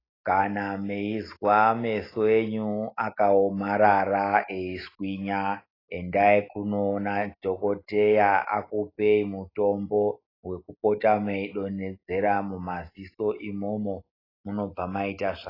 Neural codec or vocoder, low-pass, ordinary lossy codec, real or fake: none; 5.4 kHz; AAC, 24 kbps; real